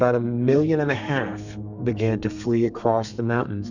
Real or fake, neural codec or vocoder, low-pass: fake; codec, 32 kHz, 1.9 kbps, SNAC; 7.2 kHz